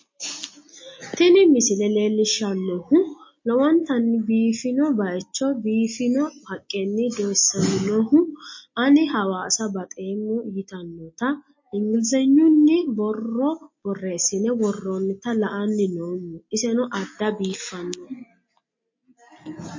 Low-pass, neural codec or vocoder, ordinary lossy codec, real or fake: 7.2 kHz; none; MP3, 32 kbps; real